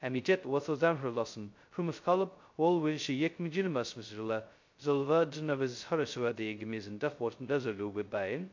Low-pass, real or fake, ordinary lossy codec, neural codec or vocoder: 7.2 kHz; fake; MP3, 48 kbps; codec, 16 kHz, 0.2 kbps, FocalCodec